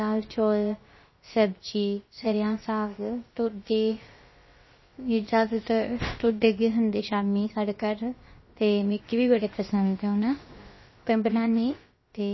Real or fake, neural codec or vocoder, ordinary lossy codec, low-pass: fake; codec, 16 kHz, about 1 kbps, DyCAST, with the encoder's durations; MP3, 24 kbps; 7.2 kHz